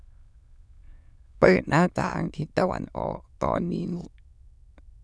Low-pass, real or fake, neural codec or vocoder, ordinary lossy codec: none; fake; autoencoder, 22.05 kHz, a latent of 192 numbers a frame, VITS, trained on many speakers; none